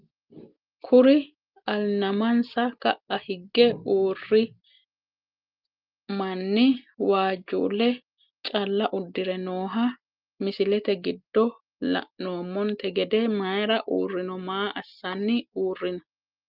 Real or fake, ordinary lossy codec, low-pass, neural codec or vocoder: real; Opus, 32 kbps; 5.4 kHz; none